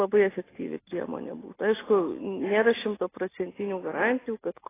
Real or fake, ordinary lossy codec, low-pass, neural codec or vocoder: real; AAC, 16 kbps; 3.6 kHz; none